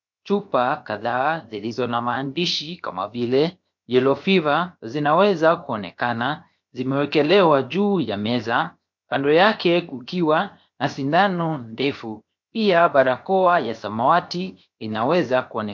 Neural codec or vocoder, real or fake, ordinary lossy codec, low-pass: codec, 16 kHz, 0.7 kbps, FocalCodec; fake; MP3, 48 kbps; 7.2 kHz